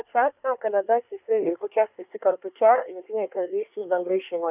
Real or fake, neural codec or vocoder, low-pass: fake; codec, 24 kHz, 1 kbps, SNAC; 3.6 kHz